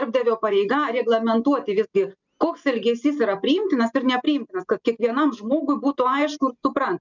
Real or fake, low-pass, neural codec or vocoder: real; 7.2 kHz; none